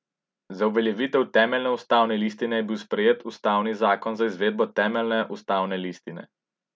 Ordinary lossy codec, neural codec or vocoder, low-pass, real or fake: none; none; none; real